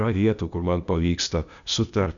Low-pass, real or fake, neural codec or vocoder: 7.2 kHz; fake; codec, 16 kHz, 0.8 kbps, ZipCodec